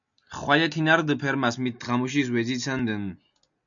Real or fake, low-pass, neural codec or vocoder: real; 7.2 kHz; none